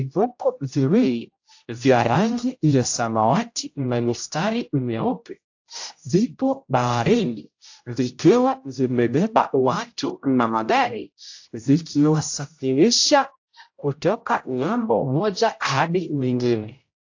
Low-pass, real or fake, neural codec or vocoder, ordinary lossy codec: 7.2 kHz; fake; codec, 16 kHz, 0.5 kbps, X-Codec, HuBERT features, trained on general audio; AAC, 48 kbps